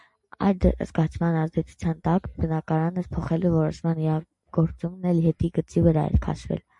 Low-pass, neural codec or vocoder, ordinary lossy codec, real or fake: 10.8 kHz; none; MP3, 64 kbps; real